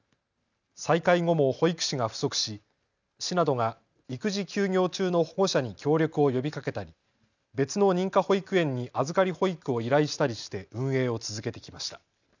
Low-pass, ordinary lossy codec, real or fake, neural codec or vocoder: 7.2 kHz; none; real; none